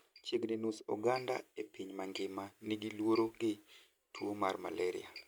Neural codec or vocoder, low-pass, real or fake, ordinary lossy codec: none; none; real; none